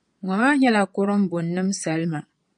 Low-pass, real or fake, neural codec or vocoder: 9.9 kHz; fake; vocoder, 22.05 kHz, 80 mel bands, Vocos